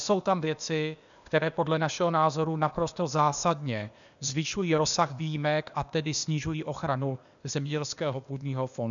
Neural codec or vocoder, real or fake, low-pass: codec, 16 kHz, 0.8 kbps, ZipCodec; fake; 7.2 kHz